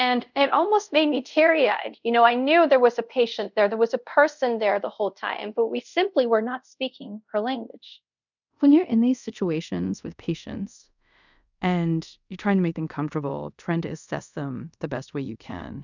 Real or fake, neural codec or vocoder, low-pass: fake; codec, 24 kHz, 0.5 kbps, DualCodec; 7.2 kHz